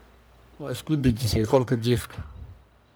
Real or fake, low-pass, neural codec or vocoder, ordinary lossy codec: fake; none; codec, 44.1 kHz, 1.7 kbps, Pupu-Codec; none